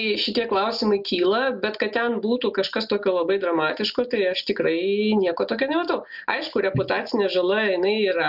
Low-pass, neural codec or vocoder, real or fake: 5.4 kHz; none; real